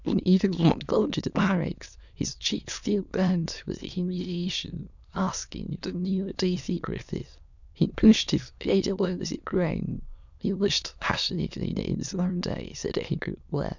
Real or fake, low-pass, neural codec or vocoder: fake; 7.2 kHz; autoencoder, 22.05 kHz, a latent of 192 numbers a frame, VITS, trained on many speakers